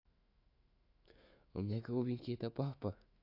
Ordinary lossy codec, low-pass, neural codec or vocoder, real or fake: AAC, 48 kbps; 5.4 kHz; autoencoder, 48 kHz, 128 numbers a frame, DAC-VAE, trained on Japanese speech; fake